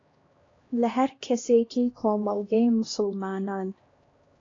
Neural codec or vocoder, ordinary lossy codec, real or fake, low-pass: codec, 16 kHz, 1 kbps, X-Codec, HuBERT features, trained on LibriSpeech; AAC, 32 kbps; fake; 7.2 kHz